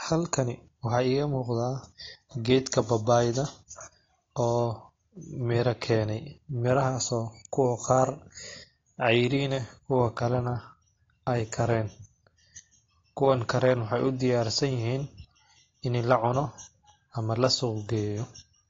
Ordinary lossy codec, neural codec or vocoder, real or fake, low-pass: AAC, 32 kbps; none; real; 7.2 kHz